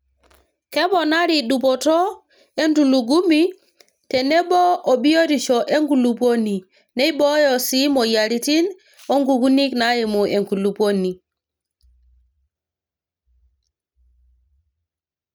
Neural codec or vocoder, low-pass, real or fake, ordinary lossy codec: none; none; real; none